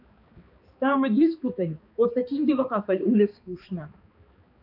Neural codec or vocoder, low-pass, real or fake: codec, 16 kHz, 2 kbps, X-Codec, HuBERT features, trained on balanced general audio; 5.4 kHz; fake